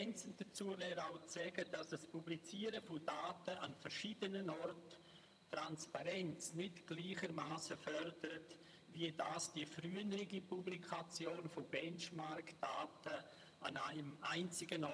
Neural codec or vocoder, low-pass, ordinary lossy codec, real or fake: vocoder, 22.05 kHz, 80 mel bands, HiFi-GAN; none; none; fake